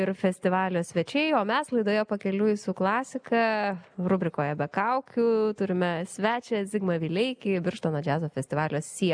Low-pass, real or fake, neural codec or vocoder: 9.9 kHz; real; none